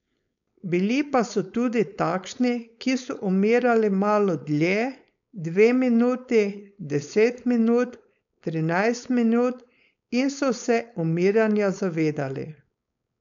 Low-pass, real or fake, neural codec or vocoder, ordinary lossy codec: 7.2 kHz; fake; codec, 16 kHz, 4.8 kbps, FACodec; none